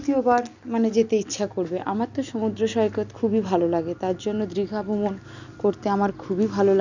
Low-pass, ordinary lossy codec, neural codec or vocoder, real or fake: 7.2 kHz; none; none; real